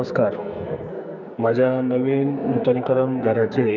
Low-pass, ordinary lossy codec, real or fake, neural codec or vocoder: 7.2 kHz; none; fake; codec, 44.1 kHz, 2.6 kbps, SNAC